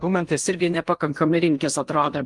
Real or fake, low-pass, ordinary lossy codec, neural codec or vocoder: fake; 10.8 kHz; Opus, 32 kbps; codec, 16 kHz in and 24 kHz out, 0.8 kbps, FocalCodec, streaming, 65536 codes